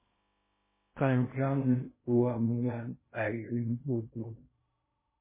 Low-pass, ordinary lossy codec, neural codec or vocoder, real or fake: 3.6 kHz; MP3, 16 kbps; codec, 16 kHz in and 24 kHz out, 0.6 kbps, FocalCodec, streaming, 2048 codes; fake